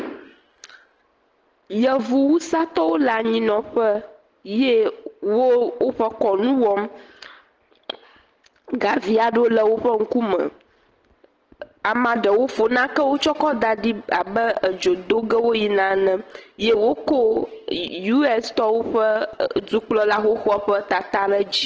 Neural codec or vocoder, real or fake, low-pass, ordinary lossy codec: none; real; 7.2 kHz; Opus, 16 kbps